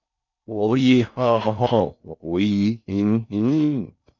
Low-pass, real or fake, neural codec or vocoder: 7.2 kHz; fake; codec, 16 kHz in and 24 kHz out, 0.6 kbps, FocalCodec, streaming, 4096 codes